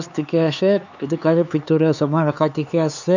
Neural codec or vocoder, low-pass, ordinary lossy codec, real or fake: codec, 16 kHz, 4 kbps, X-Codec, HuBERT features, trained on LibriSpeech; 7.2 kHz; none; fake